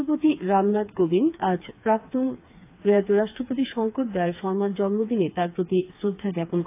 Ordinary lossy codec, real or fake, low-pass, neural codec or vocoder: AAC, 24 kbps; fake; 3.6 kHz; codec, 16 kHz, 4 kbps, FreqCodec, smaller model